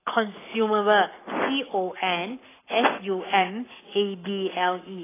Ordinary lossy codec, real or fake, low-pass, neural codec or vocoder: AAC, 16 kbps; fake; 3.6 kHz; codec, 44.1 kHz, 7.8 kbps, Pupu-Codec